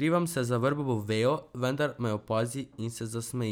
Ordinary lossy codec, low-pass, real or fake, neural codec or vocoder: none; none; real; none